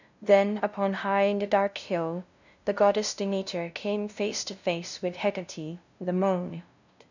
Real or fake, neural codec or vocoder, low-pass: fake; codec, 16 kHz, 0.5 kbps, FunCodec, trained on LibriTTS, 25 frames a second; 7.2 kHz